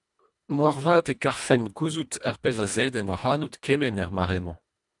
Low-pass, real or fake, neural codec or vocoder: 10.8 kHz; fake; codec, 24 kHz, 1.5 kbps, HILCodec